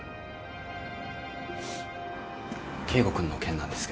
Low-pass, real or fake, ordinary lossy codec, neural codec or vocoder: none; real; none; none